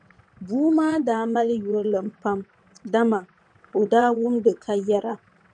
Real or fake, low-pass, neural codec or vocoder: fake; 9.9 kHz; vocoder, 22.05 kHz, 80 mel bands, WaveNeXt